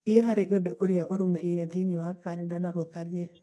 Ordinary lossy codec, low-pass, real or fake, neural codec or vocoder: none; none; fake; codec, 24 kHz, 0.9 kbps, WavTokenizer, medium music audio release